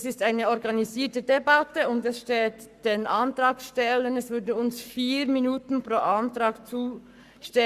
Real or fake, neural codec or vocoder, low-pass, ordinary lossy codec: fake; codec, 44.1 kHz, 7.8 kbps, Pupu-Codec; 14.4 kHz; Opus, 64 kbps